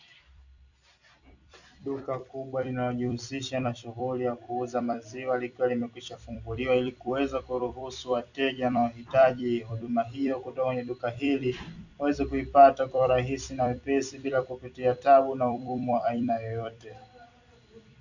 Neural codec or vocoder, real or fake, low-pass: vocoder, 44.1 kHz, 128 mel bands every 256 samples, BigVGAN v2; fake; 7.2 kHz